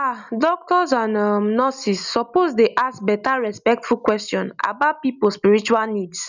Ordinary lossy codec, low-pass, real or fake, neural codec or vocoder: none; 7.2 kHz; real; none